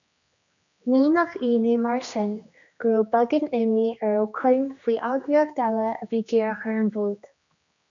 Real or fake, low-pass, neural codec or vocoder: fake; 7.2 kHz; codec, 16 kHz, 2 kbps, X-Codec, HuBERT features, trained on general audio